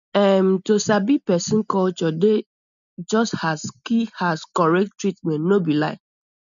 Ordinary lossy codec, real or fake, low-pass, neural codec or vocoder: none; real; 7.2 kHz; none